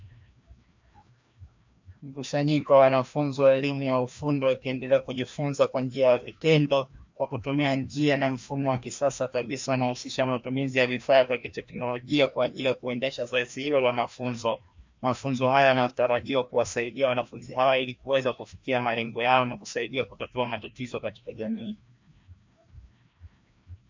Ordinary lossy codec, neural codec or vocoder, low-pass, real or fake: MP3, 64 kbps; codec, 16 kHz, 1 kbps, FreqCodec, larger model; 7.2 kHz; fake